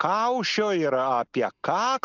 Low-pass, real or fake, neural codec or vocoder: 7.2 kHz; real; none